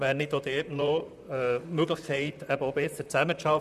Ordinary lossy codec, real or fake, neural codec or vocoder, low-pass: none; fake; vocoder, 44.1 kHz, 128 mel bands, Pupu-Vocoder; 14.4 kHz